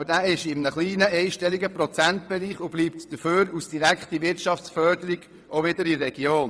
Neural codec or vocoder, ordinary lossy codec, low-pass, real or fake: vocoder, 22.05 kHz, 80 mel bands, WaveNeXt; none; none; fake